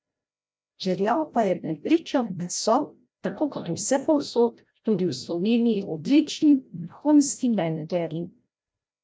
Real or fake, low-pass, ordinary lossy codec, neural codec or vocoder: fake; none; none; codec, 16 kHz, 0.5 kbps, FreqCodec, larger model